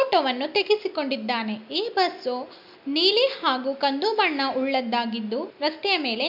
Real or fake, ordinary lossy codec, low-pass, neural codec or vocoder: real; MP3, 48 kbps; 5.4 kHz; none